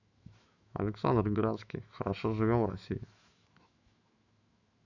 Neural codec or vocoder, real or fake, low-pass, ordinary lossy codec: autoencoder, 48 kHz, 128 numbers a frame, DAC-VAE, trained on Japanese speech; fake; 7.2 kHz; none